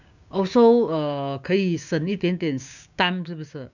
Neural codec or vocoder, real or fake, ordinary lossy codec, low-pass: codec, 16 kHz, 6 kbps, DAC; fake; none; 7.2 kHz